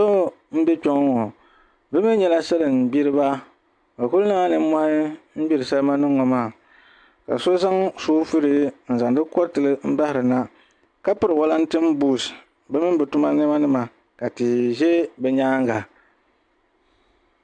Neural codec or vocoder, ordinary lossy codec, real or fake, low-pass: none; AAC, 64 kbps; real; 9.9 kHz